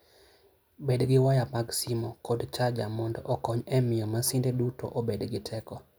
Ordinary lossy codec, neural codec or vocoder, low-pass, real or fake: none; none; none; real